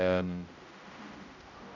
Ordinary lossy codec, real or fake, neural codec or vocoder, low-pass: none; fake; codec, 16 kHz, 0.5 kbps, X-Codec, HuBERT features, trained on general audio; 7.2 kHz